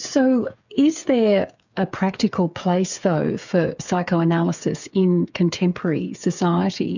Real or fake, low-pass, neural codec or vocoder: fake; 7.2 kHz; codec, 16 kHz, 8 kbps, FreqCodec, smaller model